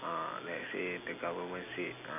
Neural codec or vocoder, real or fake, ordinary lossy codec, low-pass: none; real; none; 3.6 kHz